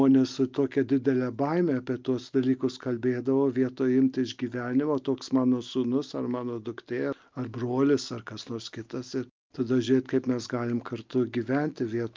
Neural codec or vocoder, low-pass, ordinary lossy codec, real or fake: none; 7.2 kHz; Opus, 24 kbps; real